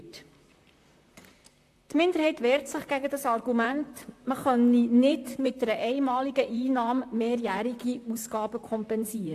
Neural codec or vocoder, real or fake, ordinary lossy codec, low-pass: vocoder, 44.1 kHz, 128 mel bands, Pupu-Vocoder; fake; AAC, 64 kbps; 14.4 kHz